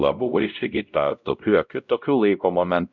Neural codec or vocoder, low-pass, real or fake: codec, 16 kHz, 0.5 kbps, X-Codec, WavLM features, trained on Multilingual LibriSpeech; 7.2 kHz; fake